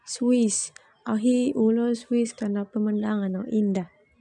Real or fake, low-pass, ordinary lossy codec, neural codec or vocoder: real; 10.8 kHz; none; none